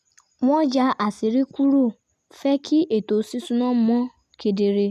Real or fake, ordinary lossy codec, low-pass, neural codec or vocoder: real; MP3, 96 kbps; 14.4 kHz; none